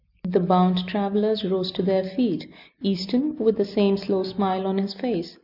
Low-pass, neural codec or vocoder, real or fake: 5.4 kHz; none; real